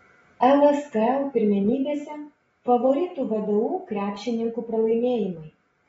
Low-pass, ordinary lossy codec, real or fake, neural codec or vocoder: 19.8 kHz; AAC, 24 kbps; real; none